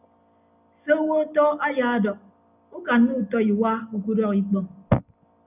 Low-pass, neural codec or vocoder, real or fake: 3.6 kHz; none; real